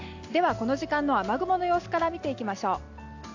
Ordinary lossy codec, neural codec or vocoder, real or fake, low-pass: AAC, 48 kbps; none; real; 7.2 kHz